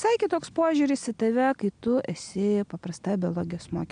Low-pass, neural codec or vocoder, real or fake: 9.9 kHz; none; real